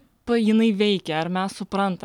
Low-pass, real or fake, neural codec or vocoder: 19.8 kHz; real; none